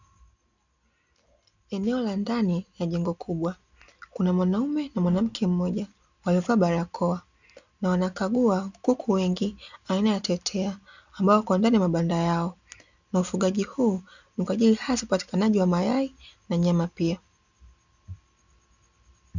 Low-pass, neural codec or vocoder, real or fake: 7.2 kHz; none; real